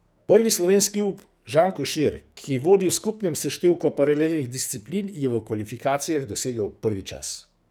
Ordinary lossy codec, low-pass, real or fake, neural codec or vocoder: none; none; fake; codec, 44.1 kHz, 2.6 kbps, SNAC